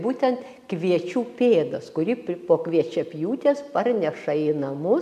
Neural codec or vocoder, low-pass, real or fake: none; 14.4 kHz; real